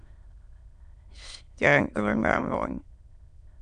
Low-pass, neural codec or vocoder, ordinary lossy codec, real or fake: 9.9 kHz; autoencoder, 22.05 kHz, a latent of 192 numbers a frame, VITS, trained on many speakers; none; fake